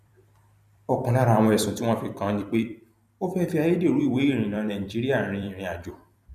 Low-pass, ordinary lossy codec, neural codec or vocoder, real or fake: 14.4 kHz; none; vocoder, 44.1 kHz, 128 mel bands every 256 samples, BigVGAN v2; fake